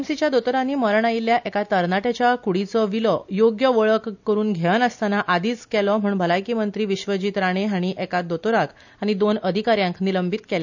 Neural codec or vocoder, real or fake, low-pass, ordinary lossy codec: none; real; 7.2 kHz; none